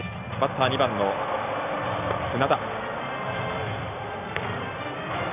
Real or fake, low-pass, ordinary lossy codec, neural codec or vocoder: real; 3.6 kHz; none; none